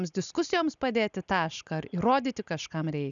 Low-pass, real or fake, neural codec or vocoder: 7.2 kHz; real; none